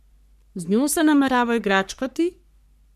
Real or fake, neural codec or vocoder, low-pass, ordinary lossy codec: fake; codec, 44.1 kHz, 3.4 kbps, Pupu-Codec; 14.4 kHz; none